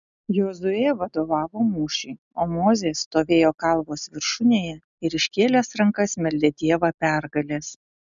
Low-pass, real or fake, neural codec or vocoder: 7.2 kHz; real; none